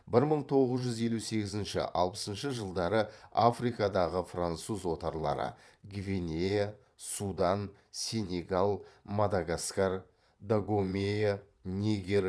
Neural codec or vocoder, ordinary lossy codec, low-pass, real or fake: vocoder, 22.05 kHz, 80 mel bands, Vocos; none; none; fake